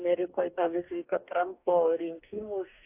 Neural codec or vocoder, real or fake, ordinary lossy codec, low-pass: codec, 44.1 kHz, 2.6 kbps, DAC; fake; AAC, 24 kbps; 3.6 kHz